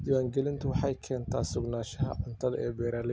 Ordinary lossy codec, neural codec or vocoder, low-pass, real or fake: none; none; none; real